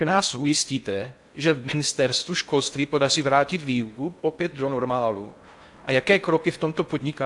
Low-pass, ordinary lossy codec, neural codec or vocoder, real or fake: 10.8 kHz; AAC, 64 kbps; codec, 16 kHz in and 24 kHz out, 0.6 kbps, FocalCodec, streaming, 4096 codes; fake